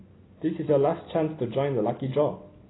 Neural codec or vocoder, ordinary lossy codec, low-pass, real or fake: none; AAC, 16 kbps; 7.2 kHz; real